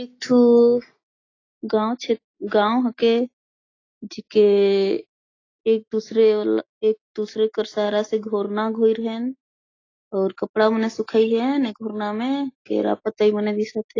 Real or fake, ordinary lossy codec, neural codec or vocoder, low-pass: real; AAC, 32 kbps; none; 7.2 kHz